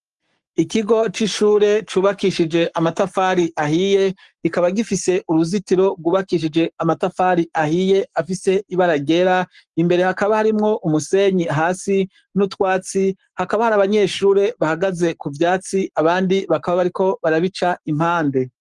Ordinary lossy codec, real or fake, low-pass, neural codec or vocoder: Opus, 24 kbps; fake; 10.8 kHz; codec, 44.1 kHz, 7.8 kbps, Pupu-Codec